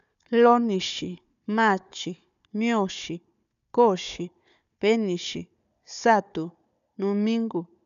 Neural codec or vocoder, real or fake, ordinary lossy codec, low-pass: codec, 16 kHz, 4 kbps, FunCodec, trained on Chinese and English, 50 frames a second; fake; none; 7.2 kHz